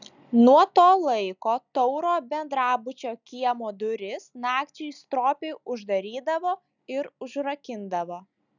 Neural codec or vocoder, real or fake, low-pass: none; real; 7.2 kHz